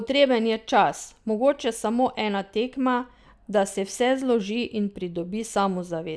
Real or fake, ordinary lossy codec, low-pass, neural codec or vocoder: real; none; none; none